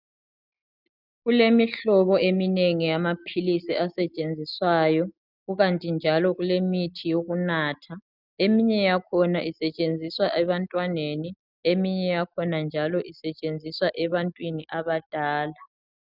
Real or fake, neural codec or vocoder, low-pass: real; none; 5.4 kHz